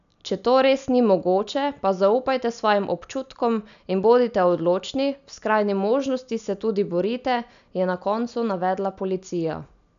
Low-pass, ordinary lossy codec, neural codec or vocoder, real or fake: 7.2 kHz; none; none; real